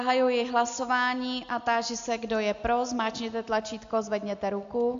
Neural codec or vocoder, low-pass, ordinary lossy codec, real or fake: none; 7.2 kHz; AAC, 64 kbps; real